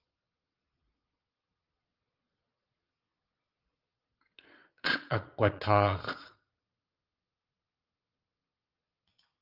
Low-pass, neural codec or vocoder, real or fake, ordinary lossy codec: 5.4 kHz; vocoder, 22.05 kHz, 80 mel bands, Vocos; fake; Opus, 32 kbps